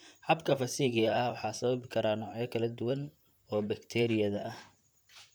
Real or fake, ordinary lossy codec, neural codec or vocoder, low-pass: fake; none; vocoder, 44.1 kHz, 128 mel bands, Pupu-Vocoder; none